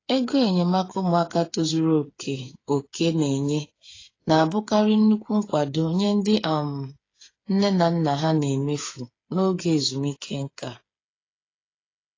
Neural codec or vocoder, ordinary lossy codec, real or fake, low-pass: codec, 16 kHz, 8 kbps, FreqCodec, smaller model; AAC, 32 kbps; fake; 7.2 kHz